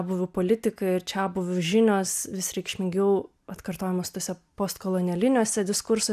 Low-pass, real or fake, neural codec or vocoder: 14.4 kHz; real; none